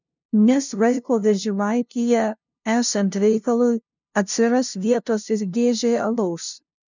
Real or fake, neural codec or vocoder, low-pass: fake; codec, 16 kHz, 0.5 kbps, FunCodec, trained on LibriTTS, 25 frames a second; 7.2 kHz